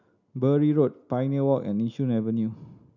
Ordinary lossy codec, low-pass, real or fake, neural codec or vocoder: none; 7.2 kHz; real; none